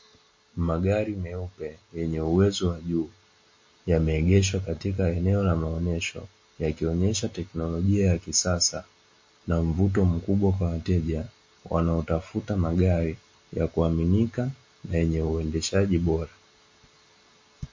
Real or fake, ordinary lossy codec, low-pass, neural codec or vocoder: real; MP3, 32 kbps; 7.2 kHz; none